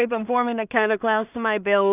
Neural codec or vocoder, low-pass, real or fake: codec, 16 kHz in and 24 kHz out, 0.4 kbps, LongCat-Audio-Codec, two codebook decoder; 3.6 kHz; fake